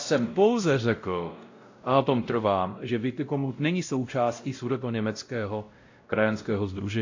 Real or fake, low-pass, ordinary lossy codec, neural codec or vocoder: fake; 7.2 kHz; AAC, 48 kbps; codec, 16 kHz, 0.5 kbps, X-Codec, WavLM features, trained on Multilingual LibriSpeech